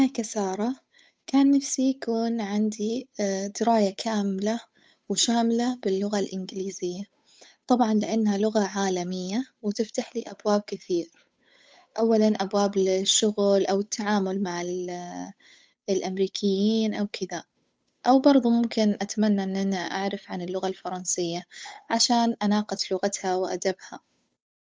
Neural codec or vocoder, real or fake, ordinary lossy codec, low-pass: codec, 16 kHz, 8 kbps, FunCodec, trained on Chinese and English, 25 frames a second; fake; none; none